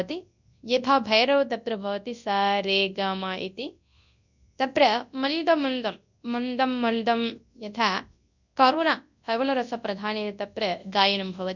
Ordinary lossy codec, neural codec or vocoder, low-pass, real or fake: none; codec, 24 kHz, 0.9 kbps, WavTokenizer, large speech release; 7.2 kHz; fake